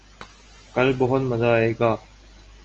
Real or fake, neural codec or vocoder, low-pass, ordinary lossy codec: real; none; 7.2 kHz; Opus, 24 kbps